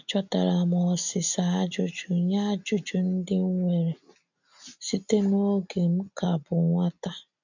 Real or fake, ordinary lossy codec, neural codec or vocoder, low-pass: real; none; none; 7.2 kHz